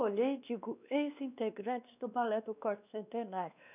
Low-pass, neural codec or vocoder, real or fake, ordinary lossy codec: 3.6 kHz; codec, 16 kHz, 2 kbps, X-Codec, WavLM features, trained on Multilingual LibriSpeech; fake; none